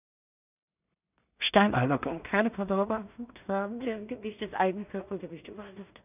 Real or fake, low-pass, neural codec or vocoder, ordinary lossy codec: fake; 3.6 kHz; codec, 16 kHz in and 24 kHz out, 0.4 kbps, LongCat-Audio-Codec, two codebook decoder; none